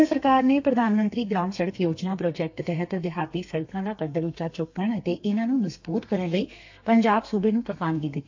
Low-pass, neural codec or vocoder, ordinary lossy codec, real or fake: 7.2 kHz; codec, 32 kHz, 1.9 kbps, SNAC; AAC, 48 kbps; fake